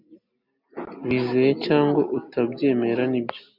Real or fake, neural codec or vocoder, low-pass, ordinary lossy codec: real; none; 5.4 kHz; MP3, 48 kbps